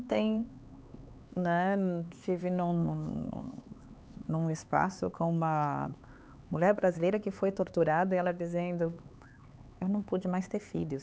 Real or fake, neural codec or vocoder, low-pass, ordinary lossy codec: fake; codec, 16 kHz, 4 kbps, X-Codec, HuBERT features, trained on LibriSpeech; none; none